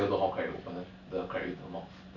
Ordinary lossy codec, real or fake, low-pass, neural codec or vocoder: none; real; 7.2 kHz; none